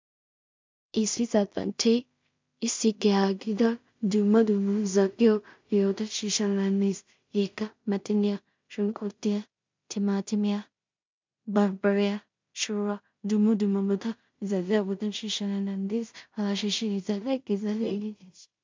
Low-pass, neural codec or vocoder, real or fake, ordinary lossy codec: 7.2 kHz; codec, 16 kHz in and 24 kHz out, 0.4 kbps, LongCat-Audio-Codec, two codebook decoder; fake; MP3, 64 kbps